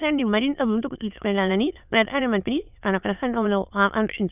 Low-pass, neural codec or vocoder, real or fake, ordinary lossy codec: 3.6 kHz; autoencoder, 22.05 kHz, a latent of 192 numbers a frame, VITS, trained on many speakers; fake; none